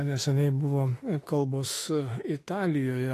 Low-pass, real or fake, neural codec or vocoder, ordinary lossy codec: 14.4 kHz; fake; autoencoder, 48 kHz, 32 numbers a frame, DAC-VAE, trained on Japanese speech; AAC, 48 kbps